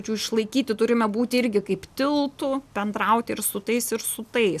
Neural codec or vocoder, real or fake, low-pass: none; real; 14.4 kHz